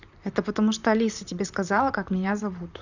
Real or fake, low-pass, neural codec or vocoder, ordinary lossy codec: fake; 7.2 kHz; vocoder, 44.1 kHz, 128 mel bands, Pupu-Vocoder; none